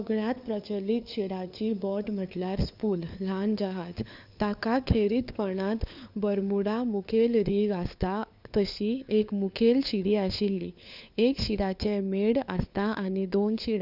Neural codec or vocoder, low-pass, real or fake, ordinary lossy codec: codec, 16 kHz, 4 kbps, FunCodec, trained on LibriTTS, 50 frames a second; 5.4 kHz; fake; none